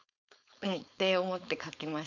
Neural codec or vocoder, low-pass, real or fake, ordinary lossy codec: codec, 16 kHz, 4.8 kbps, FACodec; 7.2 kHz; fake; none